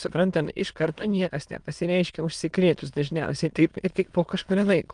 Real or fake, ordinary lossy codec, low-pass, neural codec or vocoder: fake; Opus, 32 kbps; 9.9 kHz; autoencoder, 22.05 kHz, a latent of 192 numbers a frame, VITS, trained on many speakers